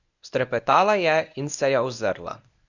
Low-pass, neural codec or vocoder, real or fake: 7.2 kHz; codec, 24 kHz, 0.9 kbps, WavTokenizer, medium speech release version 1; fake